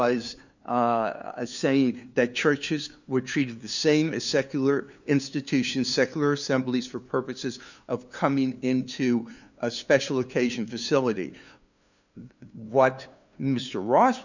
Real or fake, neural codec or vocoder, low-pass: fake; codec, 16 kHz, 2 kbps, FunCodec, trained on LibriTTS, 25 frames a second; 7.2 kHz